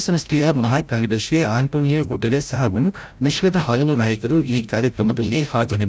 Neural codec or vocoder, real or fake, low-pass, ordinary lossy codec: codec, 16 kHz, 0.5 kbps, FreqCodec, larger model; fake; none; none